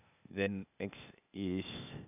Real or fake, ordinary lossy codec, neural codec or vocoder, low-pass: fake; none; codec, 16 kHz, 0.8 kbps, ZipCodec; 3.6 kHz